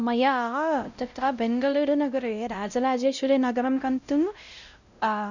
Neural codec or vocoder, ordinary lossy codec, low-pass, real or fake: codec, 16 kHz, 0.5 kbps, X-Codec, WavLM features, trained on Multilingual LibriSpeech; none; 7.2 kHz; fake